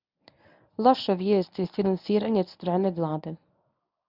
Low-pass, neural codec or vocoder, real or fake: 5.4 kHz; codec, 24 kHz, 0.9 kbps, WavTokenizer, medium speech release version 1; fake